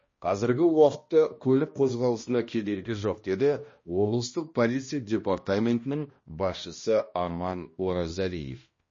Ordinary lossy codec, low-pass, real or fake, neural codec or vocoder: MP3, 32 kbps; 7.2 kHz; fake; codec, 16 kHz, 1 kbps, X-Codec, HuBERT features, trained on balanced general audio